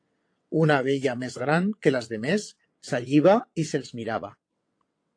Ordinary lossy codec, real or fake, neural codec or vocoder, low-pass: AAC, 48 kbps; fake; vocoder, 22.05 kHz, 80 mel bands, WaveNeXt; 9.9 kHz